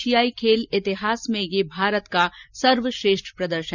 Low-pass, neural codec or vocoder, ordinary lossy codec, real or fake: 7.2 kHz; none; none; real